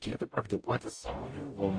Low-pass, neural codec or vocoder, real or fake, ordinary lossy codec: 9.9 kHz; codec, 44.1 kHz, 0.9 kbps, DAC; fake; AAC, 48 kbps